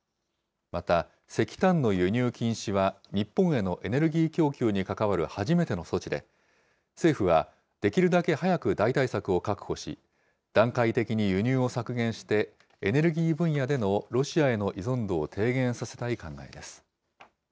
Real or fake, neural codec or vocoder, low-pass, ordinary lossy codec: real; none; none; none